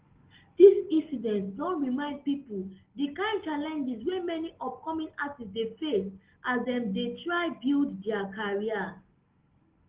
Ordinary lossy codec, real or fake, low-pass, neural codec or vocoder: Opus, 16 kbps; real; 3.6 kHz; none